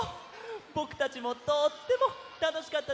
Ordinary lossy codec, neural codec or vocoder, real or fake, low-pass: none; none; real; none